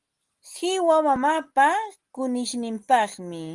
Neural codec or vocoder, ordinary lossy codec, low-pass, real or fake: none; Opus, 24 kbps; 10.8 kHz; real